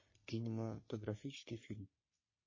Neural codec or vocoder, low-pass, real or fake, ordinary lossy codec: codec, 44.1 kHz, 3.4 kbps, Pupu-Codec; 7.2 kHz; fake; MP3, 32 kbps